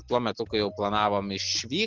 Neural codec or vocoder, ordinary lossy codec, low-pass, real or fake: none; Opus, 16 kbps; 7.2 kHz; real